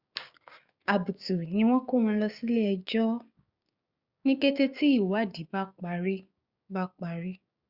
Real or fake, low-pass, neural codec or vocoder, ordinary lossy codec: fake; 5.4 kHz; codec, 16 kHz, 6 kbps, DAC; Opus, 64 kbps